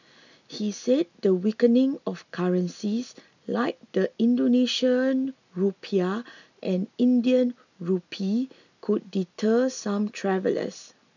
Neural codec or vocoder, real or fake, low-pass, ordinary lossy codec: none; real; 7.2 kHz; none